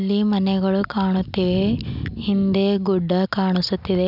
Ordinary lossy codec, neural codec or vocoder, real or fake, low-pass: none; none; real; 5.4 kHz